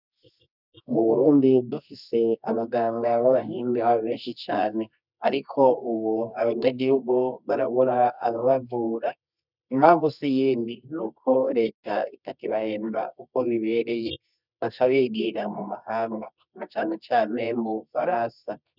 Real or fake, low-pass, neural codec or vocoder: fake; 5.4 kHz; codec, 24 kHz, 0.9 kbps, WavTokenizer, medium music audio release